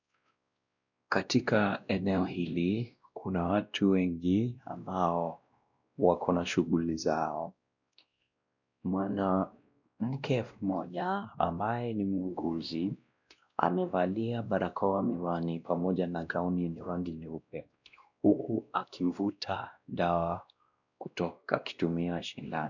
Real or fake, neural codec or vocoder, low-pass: fake; codec, 16 kHz, 1 kbps, X-Codec, WavLM features, trained on Multilingual LibriSpeech; 7.2 kHz